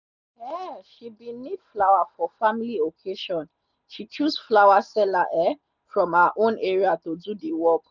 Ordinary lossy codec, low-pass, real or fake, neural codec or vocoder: none; 7.2 kHz; real; none